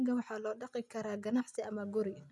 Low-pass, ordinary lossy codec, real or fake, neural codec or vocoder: none; none; real; none